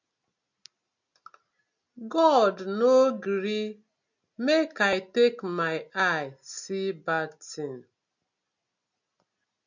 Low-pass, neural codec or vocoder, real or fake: 7.2 kHz; none; real